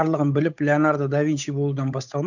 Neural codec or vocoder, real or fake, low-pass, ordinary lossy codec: codec, 16 kHz, 8 kbps, FunCodec, trained on Chinese and English, 25 frames a second; fake; 7.2 kHz; none